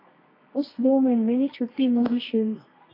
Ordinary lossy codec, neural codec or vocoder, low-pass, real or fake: AAC, 24 kbps; codec, 24 kHz, 0.9 kbps, WavTokenizer, medium music audio release; 5.4 kHz; fake